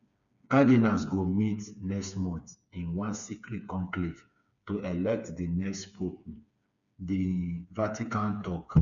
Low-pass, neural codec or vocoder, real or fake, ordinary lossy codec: 7.2 kHz; codec, 16 kHz, 4 kbps, FreqCodec, smaller model; fake; none